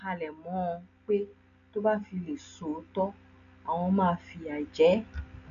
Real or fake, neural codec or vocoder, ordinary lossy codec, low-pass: real; none; none; 7.2 kHz